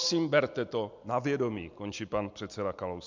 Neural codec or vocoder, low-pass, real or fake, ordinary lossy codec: none; 7.2 kHz; real; MP3, 64 kbps